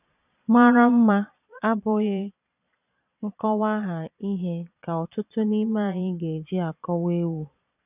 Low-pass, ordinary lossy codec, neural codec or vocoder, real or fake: 3.6 kHz; none; vocoder, 22.05 kHz, 80 mel bands, Vocos; fake